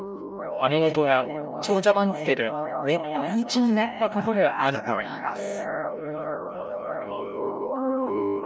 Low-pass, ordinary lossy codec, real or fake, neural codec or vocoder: none; none; fake; codec, 16 kHz, 0.5 kbps, FreqCodec, larger model